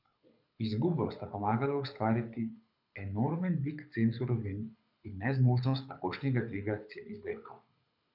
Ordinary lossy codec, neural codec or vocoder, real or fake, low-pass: none; codec, 24 kHz, 6 kbps, HILCodec; fake; 5.4 kHz